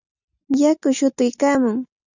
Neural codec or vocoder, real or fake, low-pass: none; real; 7.2 kHz